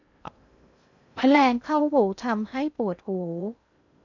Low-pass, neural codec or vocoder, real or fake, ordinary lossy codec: 7.2 kHz; codec, 16 kHz in and 24 kHz out, 0.6 kbps, FocalCodec, streaming, 2048 codes; fake; none